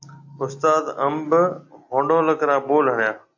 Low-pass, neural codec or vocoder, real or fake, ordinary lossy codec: 7.2 kHz; none; real; AAC, 48 kbps